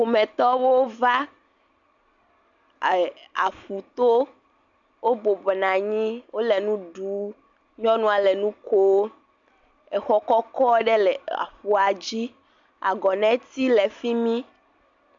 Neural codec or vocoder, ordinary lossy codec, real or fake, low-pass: none; MP3, 64 kbps; real; 7.2 kHz